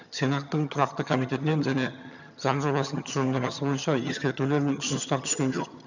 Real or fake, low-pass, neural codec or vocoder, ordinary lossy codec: fake; 7.2 kHz; vocoder, 22.05 kHz, 80 mel bands, HiFi-GAN; none